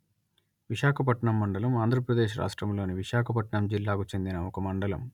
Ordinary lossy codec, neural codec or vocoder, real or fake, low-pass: none; none; real; 19.8 kHz